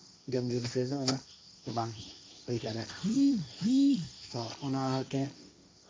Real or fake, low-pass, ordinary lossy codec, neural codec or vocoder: fake; none; none; codec, 16 kHz, 1.1 kbps, Voila-Tokenizer